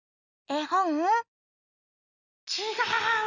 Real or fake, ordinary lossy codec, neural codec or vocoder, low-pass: real; none; none; 7.2 kHz